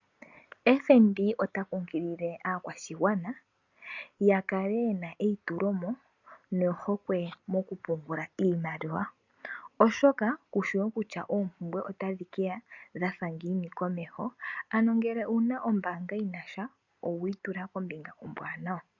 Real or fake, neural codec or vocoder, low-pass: real; none; 7.2 kHz